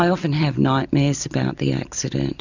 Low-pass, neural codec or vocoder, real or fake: 7.2 kHz; none; real